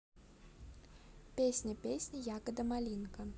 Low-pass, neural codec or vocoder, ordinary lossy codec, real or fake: none; none; none; real